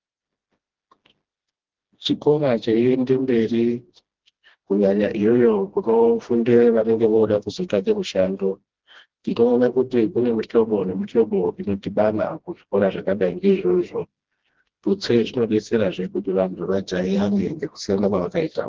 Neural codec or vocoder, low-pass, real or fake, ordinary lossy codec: codec, 16 kHz, 1 kbps, FreqCodec, smaller model; 7.2 kHz; fake; Opus, 16 kbps